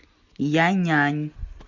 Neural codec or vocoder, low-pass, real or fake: none; 7.2 kHz; real